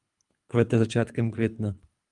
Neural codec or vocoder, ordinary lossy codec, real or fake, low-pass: codec, 24 kHz, 3 kbps, HILCodec; Opus, 32 kbps; fake; 10.8 kHz